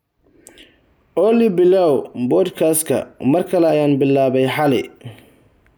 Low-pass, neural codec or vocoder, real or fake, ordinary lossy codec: none; none; real; none